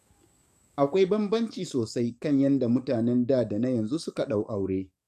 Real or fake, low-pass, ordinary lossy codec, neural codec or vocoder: fake; 14.4 kHz; MP3, 96 kbps; codec, 44.1 kHz, 7.8 kbps, DAC